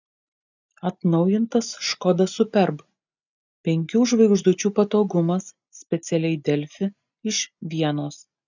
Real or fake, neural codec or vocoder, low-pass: real; none; 7.2 kHz